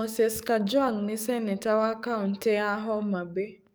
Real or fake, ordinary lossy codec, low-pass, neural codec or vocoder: fake; none; none; codec, 44.1 kHz, 7.8 kbps, DAC